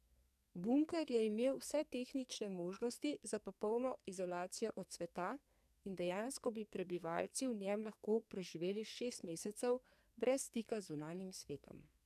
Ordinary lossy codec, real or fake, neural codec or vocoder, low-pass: none; fake; codec, 44.1 kHz, 2.6 kbps, SNAC; 14.4 kHz